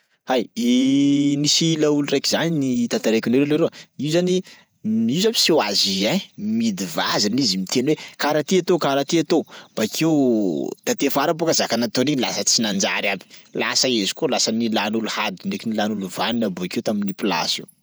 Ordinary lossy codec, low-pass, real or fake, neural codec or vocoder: none; none; fake; vocoder, 48 kHz, 128 mel bands, Vocos